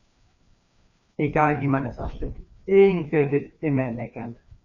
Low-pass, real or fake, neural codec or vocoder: 7.2 kHz; fake; codec, 16 kHz, 2 kbps, FreqCodec, larger model